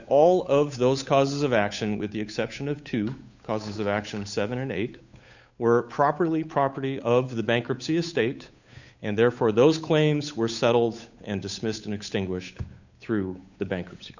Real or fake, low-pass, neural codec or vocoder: fake; 7.2 kHz; codec, 16 kHz, 8 kbps, FunCodec, trained on Chinese and English, 25 frames a second